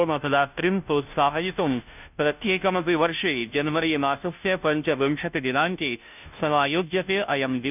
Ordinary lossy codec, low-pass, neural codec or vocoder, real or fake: none; 3.6 kHz; codec, 16 kHz, 0.5 kbps, FunCodec, trained on Chinese and English, 25 frames a second; fake